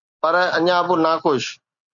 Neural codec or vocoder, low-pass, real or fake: none; 7.2 kHz; real